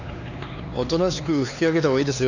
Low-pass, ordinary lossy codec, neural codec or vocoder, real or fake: 7.2 kHz; none; codec, 16 kHz, 4 kbps, X-Codec, HuBERT features, trained on LibriSpeech; fake